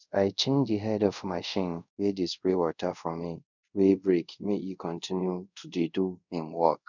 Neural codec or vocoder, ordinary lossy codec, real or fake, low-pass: codec, 24 kHz, 0.5 kbps, DualCodec; none; fake; 7.2 kHz